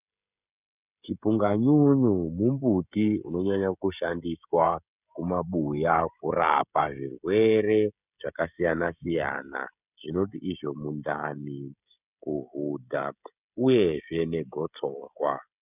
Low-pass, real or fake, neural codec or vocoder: 3.6 kHz; fake; codec, 16 kHz, 16 kbps, FreqCodec, smaller model